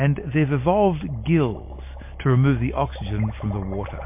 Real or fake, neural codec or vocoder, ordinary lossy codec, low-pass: real; none; MP3, 24 kbps; 3.6 kHz